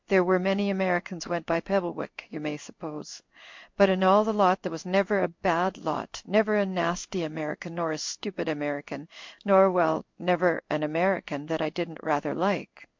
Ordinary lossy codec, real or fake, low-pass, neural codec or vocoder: MP3, 48 kbps; fake; 7.2 kHz; codec, 16 kHz in and 24 kHz out, 1 kbps, XY-Tokenizer